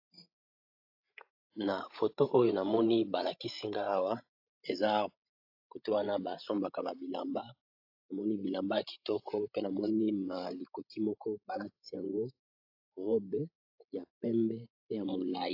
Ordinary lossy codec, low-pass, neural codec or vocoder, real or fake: AAC, 48 kbps; 5.4 kHz; codec, 16 kHz, 16 kbps, FreqCodec, larger model; fake